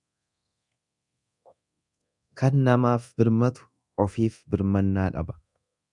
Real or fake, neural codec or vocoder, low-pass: fake; codec, 24 kHz, 0.9 kbps, DualCodec; 10.8 kHz